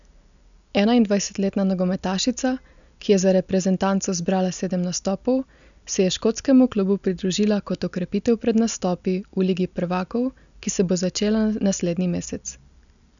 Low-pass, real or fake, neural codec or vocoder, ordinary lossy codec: 7.2 kHz; real; none; none